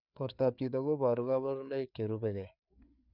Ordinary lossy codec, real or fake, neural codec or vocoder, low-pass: none; fake; codec, 16 kHz, 4 kbps, FreqCodec, larger model; 5.4 kHz